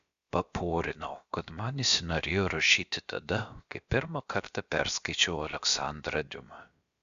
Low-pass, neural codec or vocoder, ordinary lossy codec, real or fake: 7.2 kHz; codec, 16 kHz, about 1 kbps, DyCAST, with the encoder's durations; Opus, 64 kbps; fake